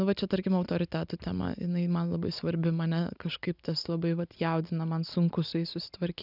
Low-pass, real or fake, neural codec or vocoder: 5.4 kHz; real; none